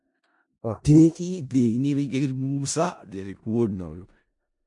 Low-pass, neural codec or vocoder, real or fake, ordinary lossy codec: 10.8 kHz; codec, 16 kHz in and 24 kHz out, 0.4 kbps, LongCat-Audio-Codec, four codebook decoder; fake; MP3, 64 kbps